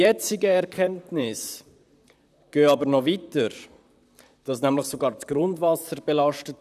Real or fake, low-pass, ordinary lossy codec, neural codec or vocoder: fake; 14.4 kHz; none; vocoder, 44.1 kHz, 128 mel bands, Pupu-Vocoder